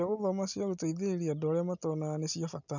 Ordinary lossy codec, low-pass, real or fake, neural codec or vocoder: none; 7.2 kHz; real; none